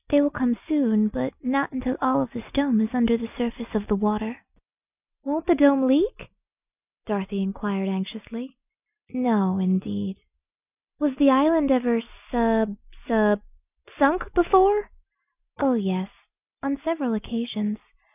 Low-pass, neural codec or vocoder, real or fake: 3.6 kHz; none; real